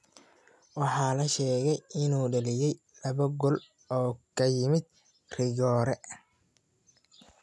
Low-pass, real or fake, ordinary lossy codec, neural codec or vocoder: none; real; none; none